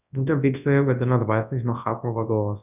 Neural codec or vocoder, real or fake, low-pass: codec, 24 kHz, 0.9 kbps, WavTokenizer, large speech release; fake; 3.6 kHz